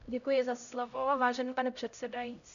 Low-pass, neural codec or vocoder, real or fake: 7.2 kHz; codec, 16 kHz, 0.5 kbps, X-Codec, HuBERT features, trained on LibriSpeech; fake